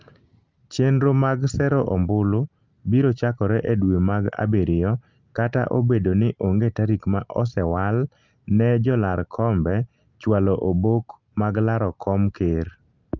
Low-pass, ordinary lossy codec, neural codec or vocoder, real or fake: 7.2 kHz; Opus, 24 kbps; none; real